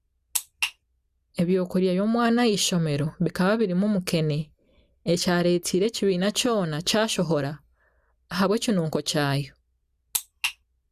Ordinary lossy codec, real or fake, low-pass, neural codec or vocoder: Opus, 64 kbps; real; 14.4 kHz; none